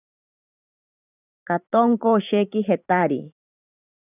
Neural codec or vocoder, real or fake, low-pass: vocoder, 44.1 kHz, 128 mel bands every 512 samples, BigVGAN v2; fake; 3.6 kHz